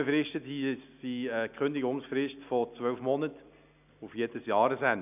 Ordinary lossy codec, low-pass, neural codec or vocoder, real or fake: none; 3.6 kHz; none; real